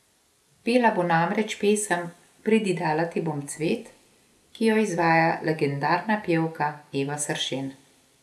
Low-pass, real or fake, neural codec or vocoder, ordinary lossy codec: none; real; none; none